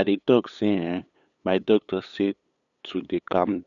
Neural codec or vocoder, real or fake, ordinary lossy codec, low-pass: codec, 16 kHz, 8 kbps, FunCodec, trained on LibriTTS, 25 frames a second; fake; none; 7.2 kHz